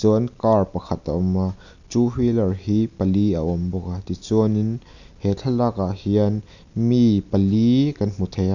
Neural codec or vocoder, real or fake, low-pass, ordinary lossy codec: none; real; 7.2 kHz; none